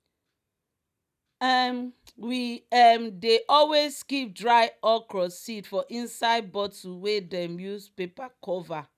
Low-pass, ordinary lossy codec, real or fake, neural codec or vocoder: 14.4 kHz; none; real; none